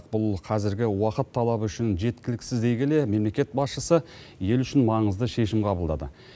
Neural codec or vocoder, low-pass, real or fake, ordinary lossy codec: none; none; real; none